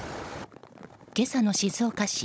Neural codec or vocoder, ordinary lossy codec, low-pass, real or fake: codec, 16 kHz, 16 kbps, FunCodec, trained on Chinese and English, 50 frames a second; none; none; fake